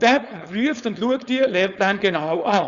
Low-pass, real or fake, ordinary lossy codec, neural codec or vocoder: 7.2 kHz; fake; none; codec, 16 kHz, 4.8 kbps, FACodec